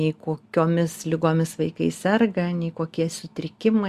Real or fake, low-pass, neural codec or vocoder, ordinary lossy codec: real; 14.4 kHz; none; Opus, 64 kbps